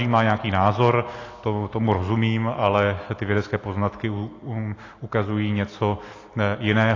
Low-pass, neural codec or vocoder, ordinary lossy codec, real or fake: 7.2 kHz; none; AAC, 32 kbps; real